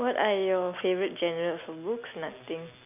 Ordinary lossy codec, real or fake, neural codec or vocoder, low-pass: none; real; none; 3.6 kHz